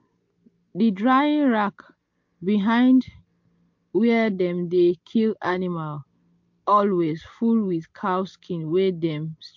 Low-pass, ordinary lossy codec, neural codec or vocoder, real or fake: 7.2 kHz; MP3, 48 kbps; none; real